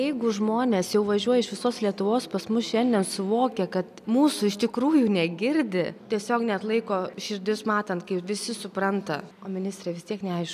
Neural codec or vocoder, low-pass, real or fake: none; 14.4 kHz; real